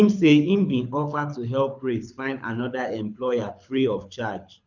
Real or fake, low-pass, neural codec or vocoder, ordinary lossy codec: fake; 7.2 kHz; codec, 24 kHz, 6 kbps, HILCodec; none